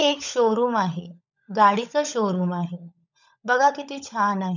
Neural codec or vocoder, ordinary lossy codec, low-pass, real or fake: codec, 16 kHz, 16 kbps, FunCodec, trained on LibriTTS, 50 frames a second; none; 7.2 kHz; fake